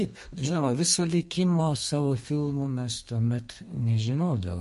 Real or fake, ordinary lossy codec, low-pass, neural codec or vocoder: fake; MP3, 48 kbps; 14.4 kHz; codec, 32 kHz, 1.9 kbps, SNAC